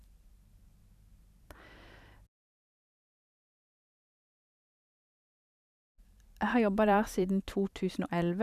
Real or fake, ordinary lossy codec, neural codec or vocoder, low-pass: fake; none; vocoder, 44.1 kHz, 128 mel bands every 512 samples, BigVGAN v2; 14.4 kHz